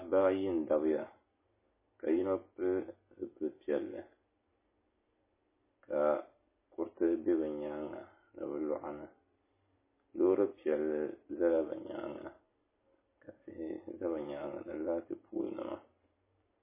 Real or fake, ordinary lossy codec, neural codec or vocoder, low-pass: real; MP3, 16 kbps; none; 3.6 kHz